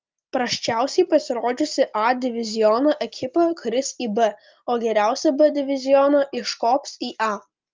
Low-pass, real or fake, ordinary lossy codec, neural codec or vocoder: 7.2 kHz; real; Opus, 32 kbps; none